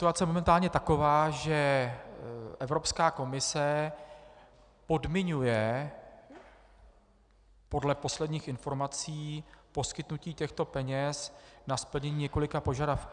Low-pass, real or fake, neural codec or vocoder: 9.9 kHz; real; none